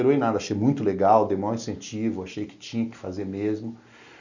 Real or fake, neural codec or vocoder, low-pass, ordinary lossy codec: real; none; 7.2 kHz; none